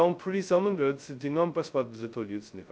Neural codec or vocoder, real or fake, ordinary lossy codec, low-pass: codec, 16 kHz, 0.2 kbps, FocalCodec; fake; none; none